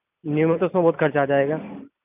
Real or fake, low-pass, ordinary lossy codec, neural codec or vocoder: real; 3.6 kHz; none; none